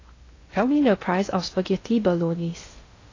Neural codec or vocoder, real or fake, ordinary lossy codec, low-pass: codec, 16 kHz in and 24 kHz out, 0.6 kbps, FocalCodec, streaming, 2048 codes; fake; AAC, 32 kbps; 7.2 kHz